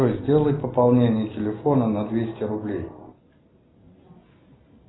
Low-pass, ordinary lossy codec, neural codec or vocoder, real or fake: 7.2 kHz; AAC, 16 kbps; none; real